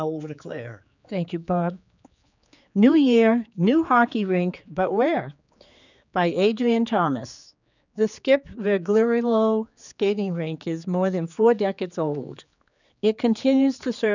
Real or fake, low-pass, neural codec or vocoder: fake; 7.2 kHz; codec, 16 kHz, 4 kbps, X-Codec, HuBERT features, trained on general audio